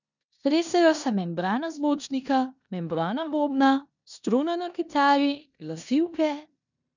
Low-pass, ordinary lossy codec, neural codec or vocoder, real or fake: 7.2 kHz; none; codec, 16 kHz in and 24 kHz out, 0.9 kbps, LongCat-Audio-Codec, four codebook decoder; fake